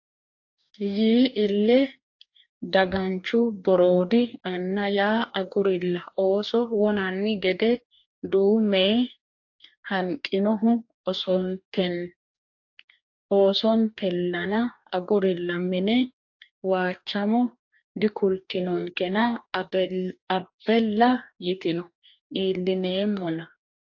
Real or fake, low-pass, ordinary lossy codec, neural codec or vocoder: fake; 7.2 kHz; AAC, 48 kbps; codec, 44.1 kHz, 2.6 kbps, DAC